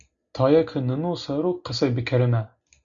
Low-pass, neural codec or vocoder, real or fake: 7.2 kHz; none; real